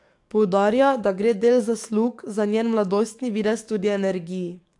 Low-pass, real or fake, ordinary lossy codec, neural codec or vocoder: 10.8 kHz; fake; AAC, 64 kbps; codec, 44.1 kHz, 7.8 kbps, DAC